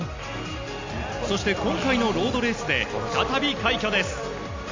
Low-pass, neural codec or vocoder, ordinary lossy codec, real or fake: 7.2 kHz; none; MP3, 64 kbps; real